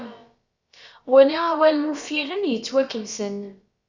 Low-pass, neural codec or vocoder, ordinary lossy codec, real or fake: 7.2 kHz; codec, 16 kHz, about 1 kbps, DyCAST, with the encoder's durations; Opus, 64 kbps; fake